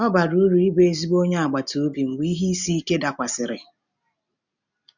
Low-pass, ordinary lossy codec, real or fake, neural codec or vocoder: 7.2 kHz; none; real; none